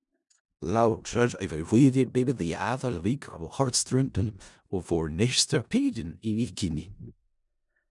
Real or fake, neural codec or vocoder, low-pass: fake; codec, 16 kHz in and 24 kHz out, 0.4 kbps, LongCat-Audio-Codec, four codebook decoder; 10.8 kHz